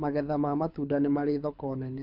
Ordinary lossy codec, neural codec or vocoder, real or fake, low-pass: MP3, 48 kbps; codec, 24 kHz, 6 kbps, HILCodec; fake; 5.4 kHz